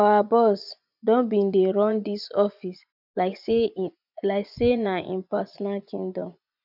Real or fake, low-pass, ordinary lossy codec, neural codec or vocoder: fake; 5.4 kHz; none; vocoder, 24 kHz, 100 mel bands, Vocos